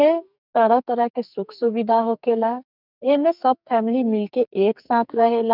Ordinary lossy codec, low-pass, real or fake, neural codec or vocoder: none; 5.4 kHz; fake; codec, 44.1 kHz, 2.6 kbps, SNAC